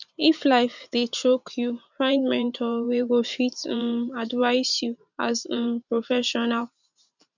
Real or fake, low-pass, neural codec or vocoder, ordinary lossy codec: fake; 7.2 kHz; vocoder, 44.1 kHz, 128 mel bands every 512 samples, BigVGAN v2; none